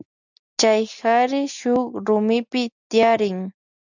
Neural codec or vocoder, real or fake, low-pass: none; real; 7.2 kHz